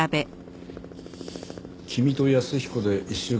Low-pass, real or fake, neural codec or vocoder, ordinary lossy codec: none; real; none; none